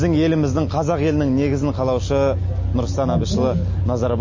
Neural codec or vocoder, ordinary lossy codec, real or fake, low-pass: none; MP3, 32 kbps; real; 7.2 kHz